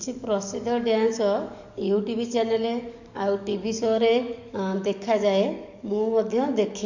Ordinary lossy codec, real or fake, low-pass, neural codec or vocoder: none; fake; 7.2 kHz; codec, 44.1 kHz, 7.8 kbps, DAC